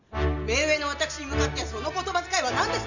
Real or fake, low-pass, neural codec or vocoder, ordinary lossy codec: real; 7.2 kHz; none; none